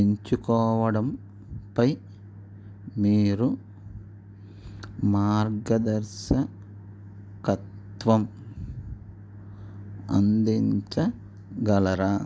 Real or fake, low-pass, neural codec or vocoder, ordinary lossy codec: real; none; none; none